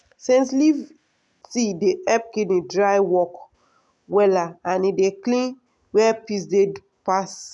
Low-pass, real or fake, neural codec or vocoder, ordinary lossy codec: 10.8 kHz; real; none; none